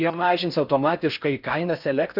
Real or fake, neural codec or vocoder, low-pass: fake; codec, 16 kHz in and 24 kHz out, 0.6 kbps, FocalCodec, streaming, 4096 codes; 5.4 kHz